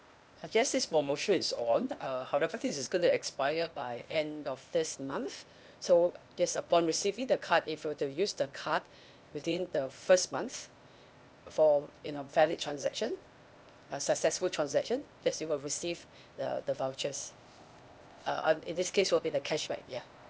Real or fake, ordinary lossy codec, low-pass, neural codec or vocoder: fake; none; none; codec, 16 kHz, 0.8 kbps, ZipCodec